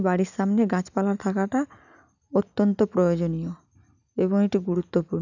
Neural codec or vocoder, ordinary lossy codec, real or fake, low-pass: none; none; real; 7.2 kHz